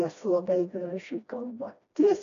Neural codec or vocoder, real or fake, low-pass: codec, 16 kHz, 1 kbps, FreqCodec, smaller model; fake; 7.2 kHz